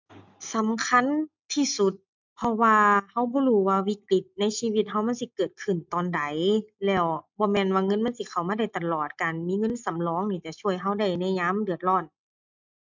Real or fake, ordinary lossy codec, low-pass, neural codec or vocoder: real; MP3, 64 kbps; 7.2 kHz; none